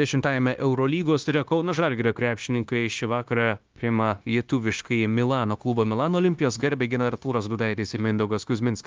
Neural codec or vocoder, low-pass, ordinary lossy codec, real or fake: codec, 16 kHz, 0.9 kbps, LongCat-Audio-Codec; 7.2 kHz; Opus, 32 kbps; fake